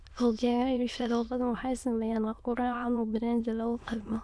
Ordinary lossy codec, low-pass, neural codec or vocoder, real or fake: none; none; autoencoder, 22.05 kHz, a latent of 192 numbers a frame, VITS, trained on many speakers; fake